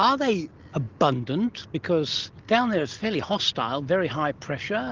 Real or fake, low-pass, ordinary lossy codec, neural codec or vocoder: real; 7.2 kHz; Opus, 16 kbps; none